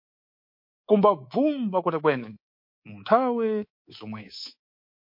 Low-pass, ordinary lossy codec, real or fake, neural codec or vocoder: 5.4 kHz; MP3, 32 kbps; fake; codec, 24 kHz, 3.1 kbps, DualCodec